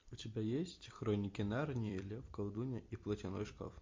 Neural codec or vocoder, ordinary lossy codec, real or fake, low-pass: vocoder, 44.1 kHz, 128 mel bands every 256 samples, BigVGAN v2; MP3, 48 kbps; fake; 7.2 kHz